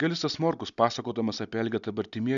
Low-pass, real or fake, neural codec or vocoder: 7.2 kHz; real; none